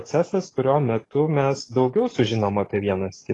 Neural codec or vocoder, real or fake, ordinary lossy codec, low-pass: codec, 44.1 kHz, 7.8 kbps, DAC; fake; AAC, 32 kbps; 10.8 kHz